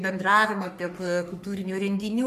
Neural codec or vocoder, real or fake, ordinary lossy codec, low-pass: codec, 44.1 kHz, 3.4 kbps, Pupu-Codec; fake; MP3, 96 kbps; 14.4 kHz